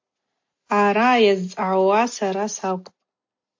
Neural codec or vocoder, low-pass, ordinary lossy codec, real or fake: none; 7.2 kHz; MP3, 48 kbps; real